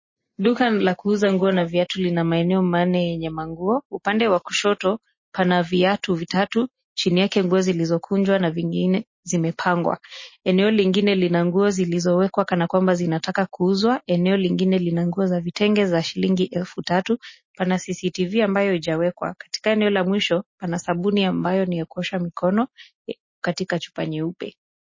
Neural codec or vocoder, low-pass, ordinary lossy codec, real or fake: none; 7.2 kHz; MP3, 32 kbps; real